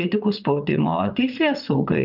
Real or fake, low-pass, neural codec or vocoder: fake; 5.4 kHz; vocoder, 22.05 kHz, 80 mel bands, WaveNeXt